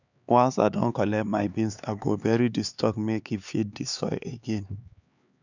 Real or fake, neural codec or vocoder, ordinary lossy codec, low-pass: fake; codec, 16 kHz, 4 kbps, X-Codec, HuBERT features, trained on LibriSpeech; none; 7.2 kHz